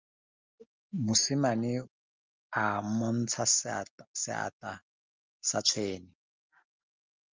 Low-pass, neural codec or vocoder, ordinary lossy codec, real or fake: 7.2 kHz; none; Opus, 24 kbps; real